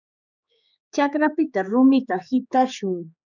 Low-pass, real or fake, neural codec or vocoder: 7.2 kHz; fake; codec, 16 kHz, 4 kbps, X-Codec, HuBERT features, trained on general audio